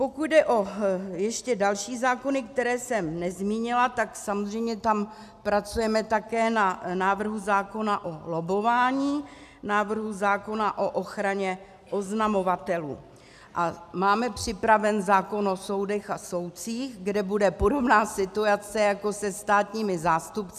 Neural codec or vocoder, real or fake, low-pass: none; real; 14.4 kHz